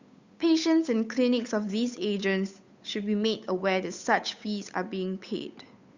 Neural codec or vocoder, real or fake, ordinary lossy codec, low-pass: codec, 16 kHz, 8 kbps, FunCodec, trained on Chinese and English, 25 frames a second; fake; Opus, 64 kbps; 7.2 kHz